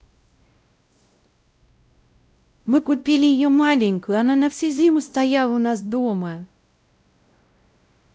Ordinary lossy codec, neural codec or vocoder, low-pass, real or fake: none; codec, 16 kHz, 0.5 kbps, X-Codec, WavLM features, trained on Multilingual LibriSpeech; none; fake